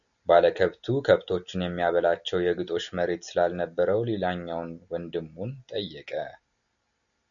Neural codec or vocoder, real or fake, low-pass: none; real; 7.2 kHz